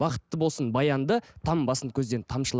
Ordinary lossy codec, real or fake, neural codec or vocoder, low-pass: none; real; none; none